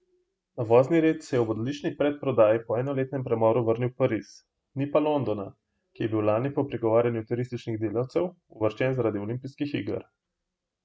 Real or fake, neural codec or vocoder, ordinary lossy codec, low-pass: real; none; none; none